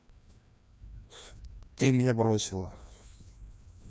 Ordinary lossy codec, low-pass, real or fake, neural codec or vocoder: none; none; fake; codec, 16 kHz, 1 kbps, FreqCodec, larger model